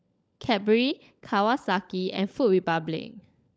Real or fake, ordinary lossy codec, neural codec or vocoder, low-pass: fake; none; codec, 16 kHz, 16 kbps, FunCodec, trained on LibriTTS, 50 frames a second; none